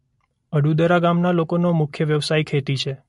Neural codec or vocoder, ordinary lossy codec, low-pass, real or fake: none; MP3, 48 kbps; 14.4 kHz; real